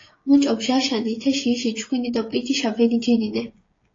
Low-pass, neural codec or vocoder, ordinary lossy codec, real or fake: 7.2 kHz; codec, 16 kHz, 16 kbps, FreqCodec, smaller model; AAC, 32 kbps; fake